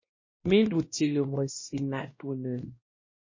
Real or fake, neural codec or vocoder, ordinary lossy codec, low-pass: fake; codec, 16 kHz, 1 kbps, X-Codec, WavLM features, trained on Multilingual LibriSpeech; MP3, 32 kbps; 7.2 kHz